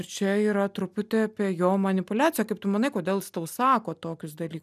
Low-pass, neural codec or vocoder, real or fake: 14.4 kHz; none; real